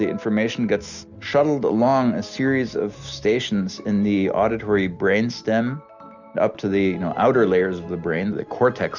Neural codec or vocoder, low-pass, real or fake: none; 7.2 kHz; real